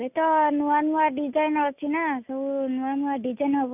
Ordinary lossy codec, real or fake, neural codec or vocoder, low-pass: none; real; none; 3.6 kHz